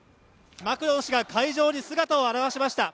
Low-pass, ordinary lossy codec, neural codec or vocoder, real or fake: none; none; none; real